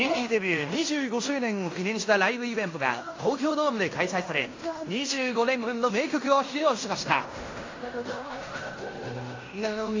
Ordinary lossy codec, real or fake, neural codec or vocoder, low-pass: AAC, 32 kbps; fake; codec, 16 kHz in and 24 kHz out, 0.9 kbps, LongCat-Audio-Codec, fine tuned four codebook decoder; 7.2 kHz